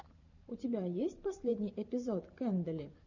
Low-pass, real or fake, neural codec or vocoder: 7.2 kHz; fake; vocoder, 44.1 kHz, 128 mel bands every 512 samples, BigVGAN v2